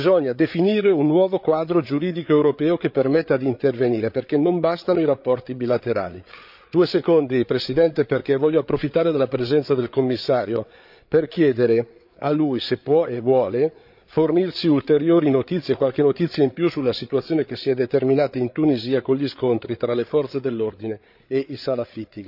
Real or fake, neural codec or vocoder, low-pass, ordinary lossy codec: fake; codec, 16 kHz, 8 kbps, FreqCodec, larger model; 5.4 kHz; none